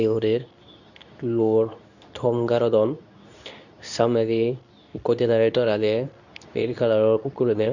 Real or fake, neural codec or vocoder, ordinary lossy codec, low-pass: fake; codec, 24 kHz, 0.9 kbps, WavTokenizer, medium speech release version 2; none; 7.2 kHz